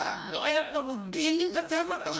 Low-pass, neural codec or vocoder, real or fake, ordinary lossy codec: none; codec, 16 kHz, 0.5 kbps, FreqCodec, larger model; fake; none